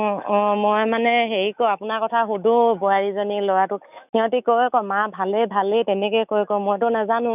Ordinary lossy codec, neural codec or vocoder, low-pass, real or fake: none; autoencoder, 48 kHz, 128 numbers a frame, DAC-VAE, trained on Japanese speech; 3.6 kHz; fake